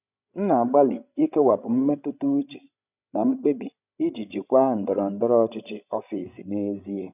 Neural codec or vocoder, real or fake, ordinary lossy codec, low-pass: codec, 16 kHz, 16 kbps, FreqCodec, larger model; fake; none; 3.6 kHz